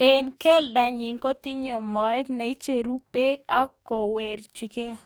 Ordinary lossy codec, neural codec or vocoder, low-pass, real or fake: none; codec, 44.1 kHz, 2.6 kbps, DAC; none; fake